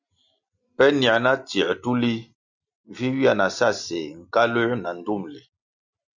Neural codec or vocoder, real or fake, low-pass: none; real; 7.2 kHz